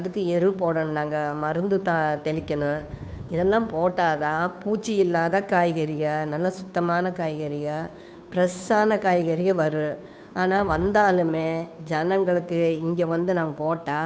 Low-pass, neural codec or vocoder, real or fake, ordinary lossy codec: none; codec, 16 kHz, 2 kbps, FunCodec, trained on Chinese and English, 25 frames a second; fake; none